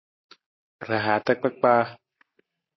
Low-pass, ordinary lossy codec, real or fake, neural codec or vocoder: 7.2 kHz; MP3, 24 kbps; real; none